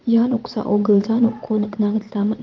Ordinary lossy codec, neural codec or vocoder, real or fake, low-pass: Opus, 32 kbps; vocoder, 22.05 kHz, 80 mel bands, Vocos; fake; 7.2 kHz